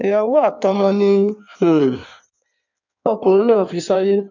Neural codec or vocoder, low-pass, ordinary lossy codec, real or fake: codec, 16 kHz in and 24 kHz out, 1.1 kbps, FireRedTTS-2 codec; 7.2 kHz; none; fake